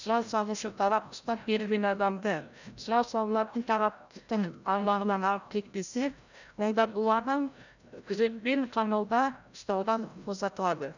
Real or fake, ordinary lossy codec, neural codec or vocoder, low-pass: fake; none; codec, 16 kHz, 0.5 kbps, FreqCodec, larger model; 7.2 kHz